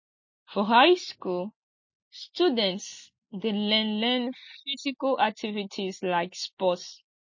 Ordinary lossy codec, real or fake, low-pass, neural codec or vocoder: MP3, 32 kbps; real; 7.2 kHz; none